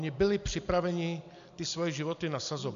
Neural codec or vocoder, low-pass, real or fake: none; 7.2 kHz; real